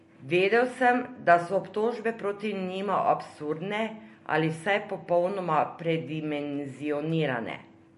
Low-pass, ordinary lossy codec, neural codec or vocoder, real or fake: 10.8 kHz; MP3, 48 kbps; none; real